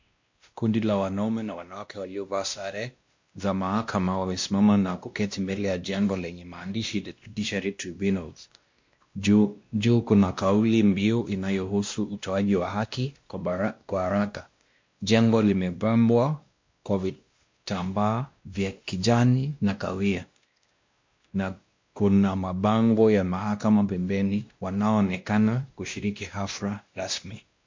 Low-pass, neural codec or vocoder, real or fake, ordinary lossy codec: 7.2 kHz; codec, 16 kHz, 1 kbps, X-Codec, WavLM features, trained on Multilingual LibriSpeech; fake; MP3, 48 kbps